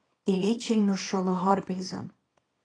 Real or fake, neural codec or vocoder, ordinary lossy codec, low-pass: fake; codec, 24 kHz, 0.9 kbps, WavTokenizer, small release; AAC, 32 kbps; 9.9 kHz